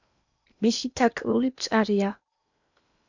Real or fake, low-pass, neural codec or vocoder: fake; 7.2 kHz; codec, 16 kHz in and 24 kHz out, 0.8 kbps, FocalCodec, streaming, 65536 codes